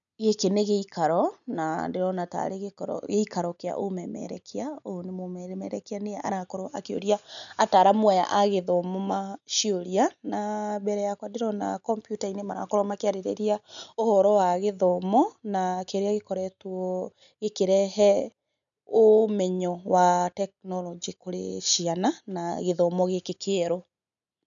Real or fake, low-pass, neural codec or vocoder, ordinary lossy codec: real; 7.2 kHz; none; none